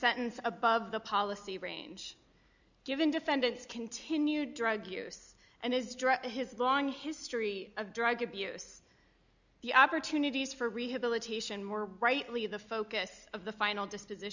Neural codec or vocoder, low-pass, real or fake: none; 7.2 kHz; real